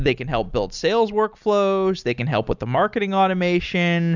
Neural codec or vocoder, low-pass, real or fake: none; 7.2 kHz; real